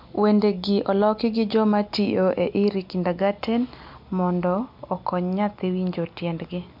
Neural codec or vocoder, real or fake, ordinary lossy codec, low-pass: none; real; MP3, 48 kbps; 5.4 kHz